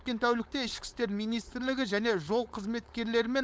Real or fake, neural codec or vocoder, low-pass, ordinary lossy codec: fake; codec, 16 kHz, 4.8 kbps, FACodec; none; none